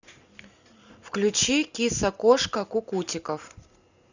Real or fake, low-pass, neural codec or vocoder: real; 7.2 kHz; none